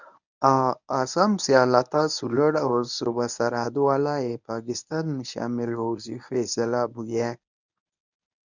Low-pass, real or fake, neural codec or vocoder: 7.2 kHz; fake; codec, 24 kHz, 0.9 kbps, WavTokenizer, medium speech release version 1